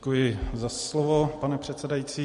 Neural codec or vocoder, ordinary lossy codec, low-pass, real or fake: none; MP3, 48 kbps; 14.4 kHz; real